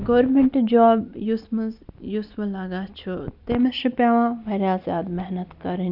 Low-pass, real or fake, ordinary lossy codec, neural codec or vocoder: 5.4 kHz; real; none; none